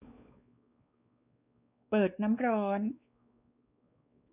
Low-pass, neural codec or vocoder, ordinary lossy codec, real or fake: 3.6 kHz; codec, 16 kHz, 4 kbps, X-Codec, WavLM features, trained on Multilingual LibriSpeech; none; fake